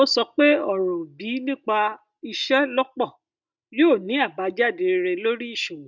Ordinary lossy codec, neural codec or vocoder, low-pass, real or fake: none; none; 7.2 kHz; real